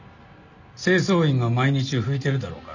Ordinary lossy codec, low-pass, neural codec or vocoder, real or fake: none; 7.2 kHz; none; real